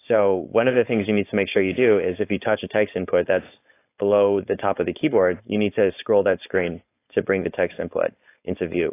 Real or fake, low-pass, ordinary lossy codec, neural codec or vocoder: fake; 3.6 kHz; AAC, 24 kbps; codec, 16 kHz, 4.8 kbps, FACodec